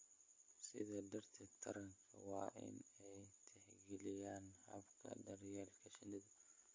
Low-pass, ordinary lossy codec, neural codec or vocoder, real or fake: 7.2 kHz; MP3, 32 kbps; none; real